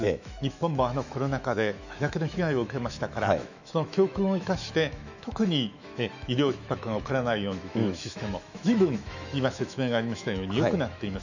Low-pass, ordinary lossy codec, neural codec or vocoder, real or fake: 7.2 kHz; none; autoencoder, 48 kHz, 128 numbers a frame, DAC-VAE, trained on Japanese speech; fake